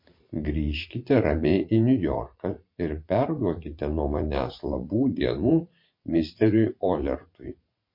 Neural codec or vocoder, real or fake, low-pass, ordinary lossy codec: vocoder, 44.1 kHz, 80 mel bands, Vocos; fake; 5.4 kHz; MP3, 32 kbps